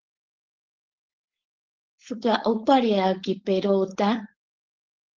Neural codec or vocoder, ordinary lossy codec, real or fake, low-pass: codec, 16 kHz, 4.8 kbps, FACodec; Opus, 16 kbps; fake; 7.2 kHz